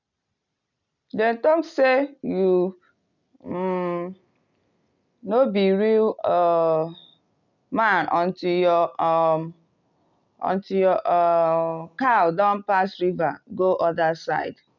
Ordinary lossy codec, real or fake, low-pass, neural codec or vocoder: none; real; 7.2 kHz; none